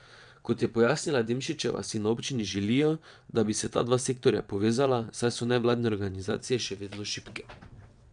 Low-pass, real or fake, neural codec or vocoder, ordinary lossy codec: 9.9 kHz; fake; vocoder, 22.05 kHz, 80 mel bands, WaveNeXt; none